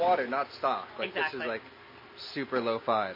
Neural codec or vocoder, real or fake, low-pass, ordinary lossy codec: none; real; 5.4 kHz; MP3, 24 kbps